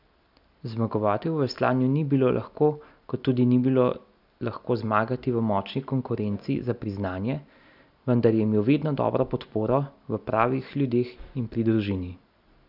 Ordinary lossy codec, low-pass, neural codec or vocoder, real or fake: none; 5.4 kHz; none; real